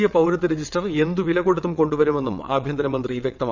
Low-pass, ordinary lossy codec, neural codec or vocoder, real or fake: 7.2 kHz; none; vocoder, 22.05 kHz, 80 mel bands, WaveNeXt; fake